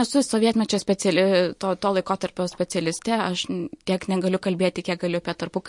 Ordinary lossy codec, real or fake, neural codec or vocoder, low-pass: MP3, 48 kbps; real; none; 10.8 kHz